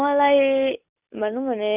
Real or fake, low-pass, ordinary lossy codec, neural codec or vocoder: real; 3.6 kHz; none; none